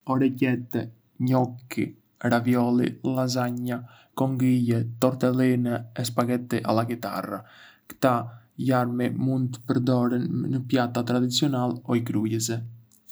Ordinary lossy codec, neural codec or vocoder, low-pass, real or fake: none; none; none; real